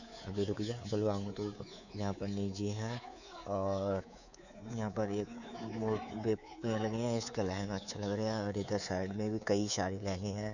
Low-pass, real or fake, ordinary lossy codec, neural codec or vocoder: 7.2 kHz; fake; none; codec, 24 kHz, 3.1 kbps, DualCodec